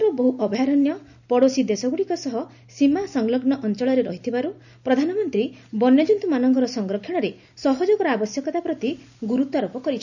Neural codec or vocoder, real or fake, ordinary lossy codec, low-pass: none; real; none; 7.2 kHz